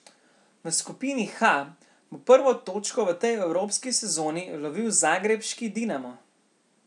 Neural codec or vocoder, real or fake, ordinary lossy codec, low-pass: none; real; none; 10.8 kHz